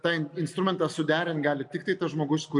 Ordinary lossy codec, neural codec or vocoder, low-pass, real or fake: MP3, 96 kbps; none; 10.8 kHz; real